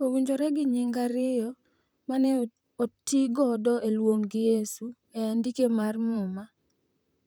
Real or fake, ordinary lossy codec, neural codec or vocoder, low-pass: fake; none; vocoder, 44.1 kHz, 128 mel bands, Pupu-Vocoder; none